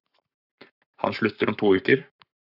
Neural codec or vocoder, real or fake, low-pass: codec, 44.1 kHz, 7.8 kbps, Pupu-Codec; fake; 5.4 kHz